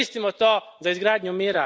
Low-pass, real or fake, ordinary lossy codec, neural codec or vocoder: none; real; none; none